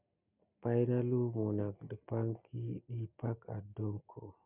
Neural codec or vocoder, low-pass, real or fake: none; 3.6 kHz; real